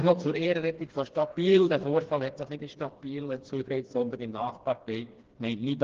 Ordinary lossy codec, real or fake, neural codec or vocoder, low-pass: Opus, 16 kbps; fake; codec, 16 kHz, 2 kbps, FreqCodec, smaller model; 7.2 kHz